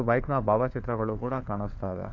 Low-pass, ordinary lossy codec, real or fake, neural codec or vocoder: 7.2 kHz; AAC, 48 kbps; fake; codec, 16 kHz, 4 kbps, FunCodec, trained on LibriTTS, 50 frames a second